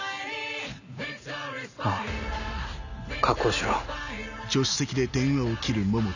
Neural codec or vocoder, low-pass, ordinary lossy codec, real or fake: none; 7.2 kHz; none; real